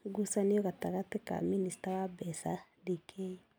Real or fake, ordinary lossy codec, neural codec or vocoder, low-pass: real; none; none; none